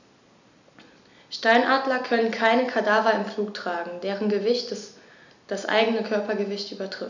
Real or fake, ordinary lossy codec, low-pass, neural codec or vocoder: real; none; 7.2 kHz; none